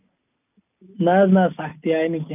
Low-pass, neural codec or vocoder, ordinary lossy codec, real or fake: 3.6 kHz; none; none; real